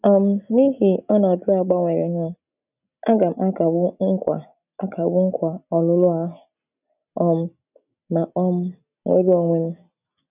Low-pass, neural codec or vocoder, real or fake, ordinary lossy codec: 3.6 kHz; none; real; none